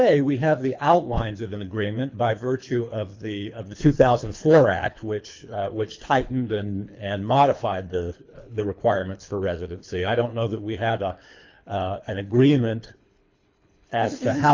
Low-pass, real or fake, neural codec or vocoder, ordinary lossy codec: 7.2 kHz; fake; codec, 24 kHz, 3 kbps, HILCodec; MP3, 64 kbps